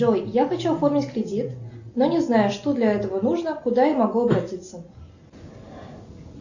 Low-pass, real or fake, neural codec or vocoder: 7.2 kHz; real; none